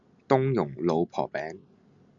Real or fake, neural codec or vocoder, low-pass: real; none; 7.2 kHz